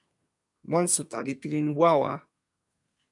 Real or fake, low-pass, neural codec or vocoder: fake; 10.8 kHz; codec, 24 kHz, 1 kbps, SNAC